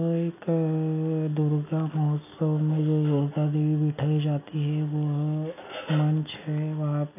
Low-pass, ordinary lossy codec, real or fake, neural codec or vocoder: 3.6 kHz; none; real; none